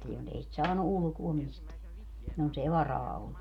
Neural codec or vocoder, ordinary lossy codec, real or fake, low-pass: none; none; real; 19.8 kHz